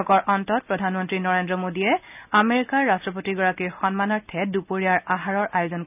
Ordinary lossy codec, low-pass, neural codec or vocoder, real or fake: none; 3.6 kHz; none; real